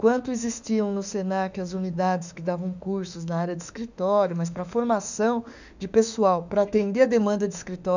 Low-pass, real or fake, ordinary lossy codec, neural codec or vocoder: 7.2 kHz; fake; none; autoencoder, 48 kHz, 32 numbers a frame, DAC-VAE, trained on Japanese speech